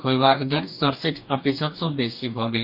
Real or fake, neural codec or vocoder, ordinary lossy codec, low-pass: fake; codec, 44.1 kHz, 2.6 kbps, DAC; none; 5.4 kHz